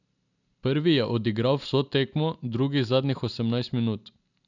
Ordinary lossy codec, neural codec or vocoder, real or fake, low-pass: none; none; real; 7.2 kHz